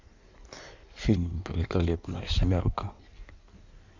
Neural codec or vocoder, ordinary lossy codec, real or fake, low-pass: codec, 16 kHz in and 24 kHz out, 1.1 kbps, FireRedTTS-2 codec; none; fake; 7.2 kHz